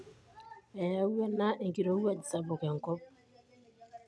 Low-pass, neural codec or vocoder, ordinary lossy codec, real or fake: none; none; none; real